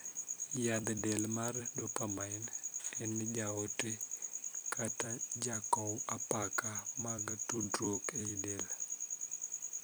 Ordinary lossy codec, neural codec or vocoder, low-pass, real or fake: none; vocoder, 44.1 kHz, 128 mel bands every 256 samples, BigVGAN v2; none; fake